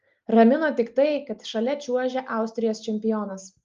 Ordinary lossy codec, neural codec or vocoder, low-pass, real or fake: Opus, 32 kbps; none; 7.2 kHz; real